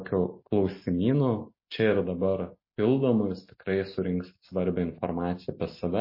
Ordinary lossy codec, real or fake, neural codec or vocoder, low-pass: MP3, 24 kbps; real; none; 5.4 kHz